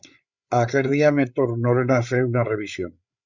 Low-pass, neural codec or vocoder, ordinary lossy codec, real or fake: 7.2 kHz; codec, 16 kHz, 8 kbps, FreqCodec, larger model; Opus, 64 kbps; fake